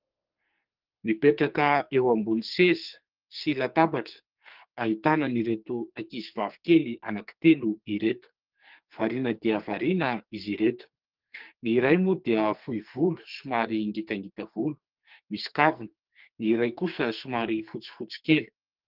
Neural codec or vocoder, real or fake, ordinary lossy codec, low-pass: codec, 44.1 kHz, 2.6 kbps, SNAC; fake; Opus, 32 kbps; 5.4 kHz